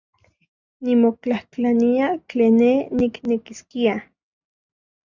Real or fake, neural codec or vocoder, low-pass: real; none; 7.2 kHz